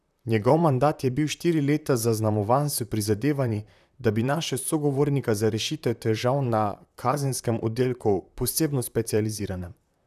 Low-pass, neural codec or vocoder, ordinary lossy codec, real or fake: 14.4 kHz; vocoder, 44.1 kHz, 128 mel bands, Pupu-Vocoder; none; fake